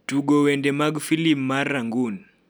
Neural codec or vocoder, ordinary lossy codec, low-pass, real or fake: none; none; none; real